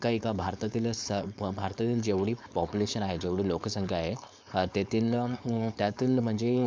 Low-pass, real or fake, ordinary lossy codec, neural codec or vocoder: none; fake; none; codec, 16 kHz, 4.8 kbps, FACodec